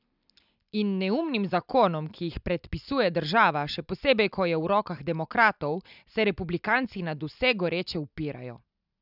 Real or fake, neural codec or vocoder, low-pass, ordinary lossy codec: real; none; 5.4 kHz; none